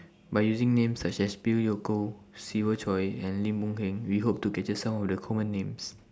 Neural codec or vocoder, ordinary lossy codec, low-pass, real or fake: none; none; none; real